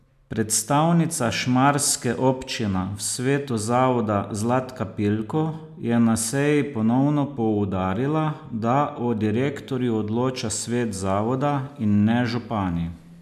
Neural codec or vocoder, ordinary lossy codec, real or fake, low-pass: none; none; real; 14.4 kHz